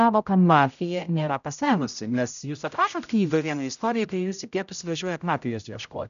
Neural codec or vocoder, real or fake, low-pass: codec, 16 kHz, 0.5 kbps, X-Codec, HuBERT features, trained on general audio; fake; 7.2 kHz